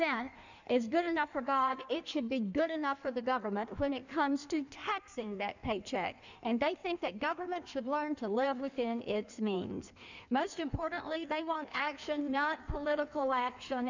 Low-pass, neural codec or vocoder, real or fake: 7.2 kHz; codec, 16 kHz in and 24 kHz out, 1.1 kbps, FireRedTTS-2 codec; fake